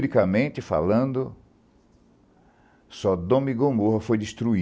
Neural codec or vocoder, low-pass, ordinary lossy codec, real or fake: none; none; none; real